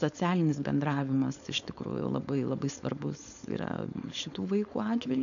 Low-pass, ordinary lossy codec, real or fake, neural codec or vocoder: 7.2 kHz; MP3, 96 kbps; fake; codec, 16 kHz, 4.8 kbps, FACodec